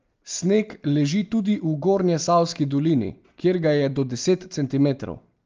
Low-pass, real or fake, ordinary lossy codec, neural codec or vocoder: 7.2 kHz; real; Opus, 24 kbps; none